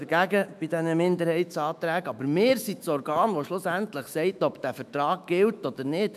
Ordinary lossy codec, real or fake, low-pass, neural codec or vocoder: none; fake; 14.4 kHz; autoencoder, 48 kHz, 128 numbers a frame, DAC-VAE, trained on Japanese speech